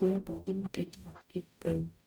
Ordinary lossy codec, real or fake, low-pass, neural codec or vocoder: none; fake; none; codec, 44.1 kHz, 0.9 kbps, DAC